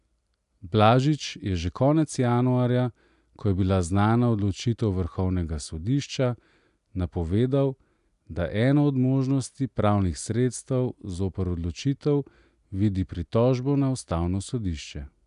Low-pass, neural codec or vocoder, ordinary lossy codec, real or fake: 10.8 kHz; none; none; real